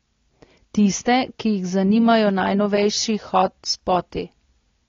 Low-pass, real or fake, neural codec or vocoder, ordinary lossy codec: 7.2 kHz; real; none; AAC, 32 kbps